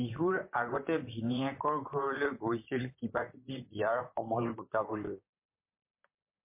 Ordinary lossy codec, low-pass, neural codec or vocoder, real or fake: MP3, 24 kbps; 3.6 kHz; vocoder, 44.1 kHz, 128 mel bands, Pupu-Vocoder; fake